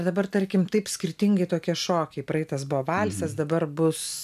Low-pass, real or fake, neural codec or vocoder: 14.4 kHz; real; none